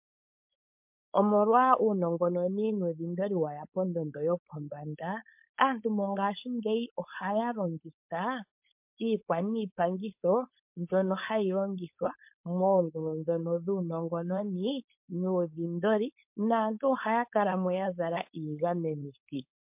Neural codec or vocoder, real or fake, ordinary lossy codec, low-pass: codec, 16 kHz, 4.8 kbps, FACodec; fake; MP3, 32 kbps; 3.6 kHz